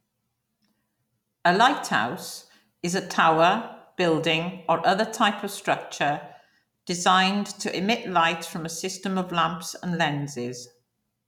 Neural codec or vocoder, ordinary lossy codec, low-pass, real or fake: none; none; 19.8 kHz; real